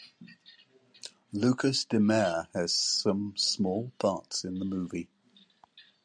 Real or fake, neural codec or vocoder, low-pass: real; none; 9.9 kHz